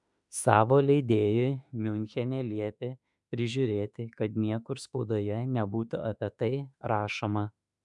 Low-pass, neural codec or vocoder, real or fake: 10.8 kHz; autoencoder, 48 kHz, 32 numbers a frame, DAC-VAE, trained on Japanese speech; fake